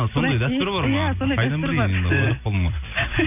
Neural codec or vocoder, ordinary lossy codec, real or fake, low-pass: none; none; real; 3.6 kHz